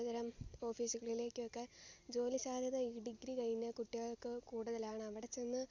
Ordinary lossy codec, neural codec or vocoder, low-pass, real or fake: Opus, 64 kbps; none; 7.2 kHz; real